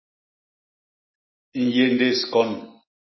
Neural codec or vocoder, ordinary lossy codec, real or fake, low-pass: none; MP3, 24 kbps; real; 7.2 kHz